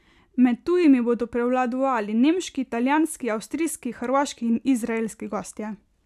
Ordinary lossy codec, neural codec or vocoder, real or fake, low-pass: none; none; real; 14.4 kHz